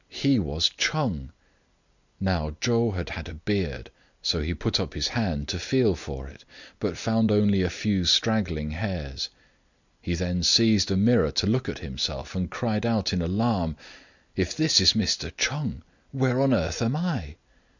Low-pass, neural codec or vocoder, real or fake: 7.2 kHz; none; real